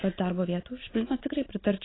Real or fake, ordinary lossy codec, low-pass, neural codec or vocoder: real; AAC, 16 kbps; 7.2 kHz; none